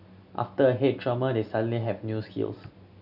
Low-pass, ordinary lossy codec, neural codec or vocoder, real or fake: 5.4 kHz; none; none; real